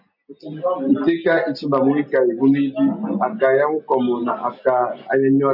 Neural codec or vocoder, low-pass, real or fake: none; 5.4 kHz; real